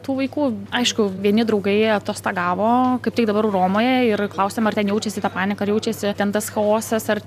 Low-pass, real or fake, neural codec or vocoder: 14.4 kHz; real; none